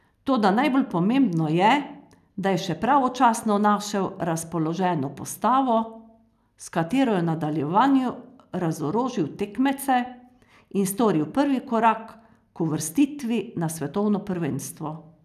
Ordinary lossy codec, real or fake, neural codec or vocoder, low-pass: none; real; none; 14.4 kHz